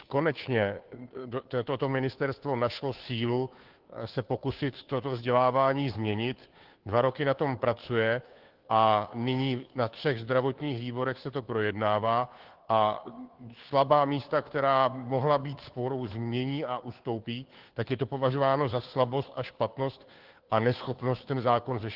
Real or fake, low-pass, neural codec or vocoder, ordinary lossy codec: fake; 5.4 kHz; codec, 16 kHz, 2 kbps, FunCodec, trained on Chinese and English, 25 frames a second; Opus, 16 kbps